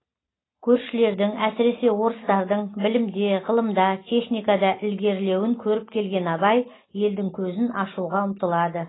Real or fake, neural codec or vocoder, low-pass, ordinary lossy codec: real; none; 7.2 kHz; AAC, 16 kbps